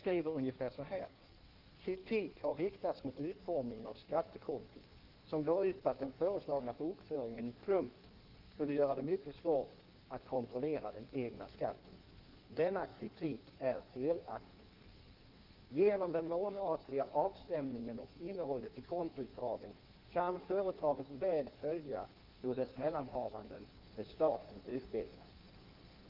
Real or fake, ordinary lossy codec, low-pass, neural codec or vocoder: fake; Opus, 32 kbps; 5.4 kHz; codec, 16 kHz in and 24 kHz out, 1.1 kbps, FireRedTTS-2 codec